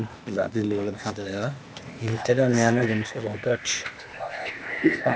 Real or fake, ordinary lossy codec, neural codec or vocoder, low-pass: fake; none; codec, 16 kHz, 0.8 kbps, ZipCodec; none